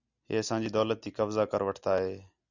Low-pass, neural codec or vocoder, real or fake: 7.2 kHz; none; real